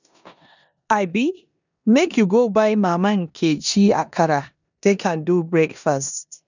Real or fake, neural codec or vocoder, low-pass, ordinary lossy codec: fake; codec, 16 kHz in and 24 kHz out, 0.9 kbps, LongCat-Audio-Codec, four codebook decoder; 7.2 kHz; none